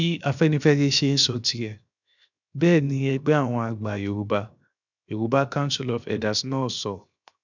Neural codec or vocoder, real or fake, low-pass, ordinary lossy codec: codec, 16 kHz, 0.7 kbps, FocalCodec; fake; 7.2 kHz; none